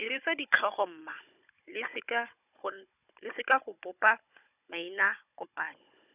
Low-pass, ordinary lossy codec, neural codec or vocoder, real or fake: 3.6 kHz; none; codec, 16 kHz, 16 kbps, FunCodec, trained on Chinese and English, 50 frames a second; fake